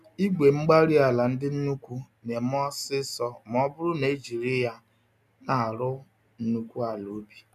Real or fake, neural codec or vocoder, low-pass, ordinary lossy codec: real; none; 14.4 kHz; none